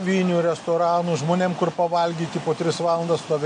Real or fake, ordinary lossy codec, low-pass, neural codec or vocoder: real; MP3, 48 kbps; 9.9 kHz; none